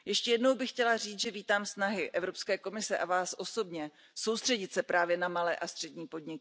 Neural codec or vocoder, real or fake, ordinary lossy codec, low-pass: none; real; none; none